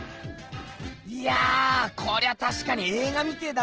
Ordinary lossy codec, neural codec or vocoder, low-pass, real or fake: Opus, 16 kbps; none; 7.2 kHz; real